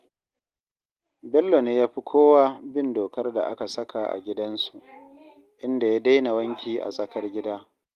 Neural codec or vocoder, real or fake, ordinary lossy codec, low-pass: none; real; Opus, 24 kbps; 14.4 kHz